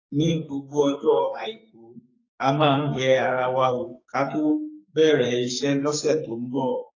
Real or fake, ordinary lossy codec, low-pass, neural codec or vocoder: fake; AAC, 32 kbps; 7.2 kHz; codec, 44.1 kHz, 2.6 kbps, SNAC